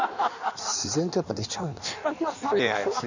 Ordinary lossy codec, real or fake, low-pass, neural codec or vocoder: none; fake; 7.2 kHz; codec, 16 kHz in and 24 kHz out, 1.1 kbps, FireRedTTS-2 codec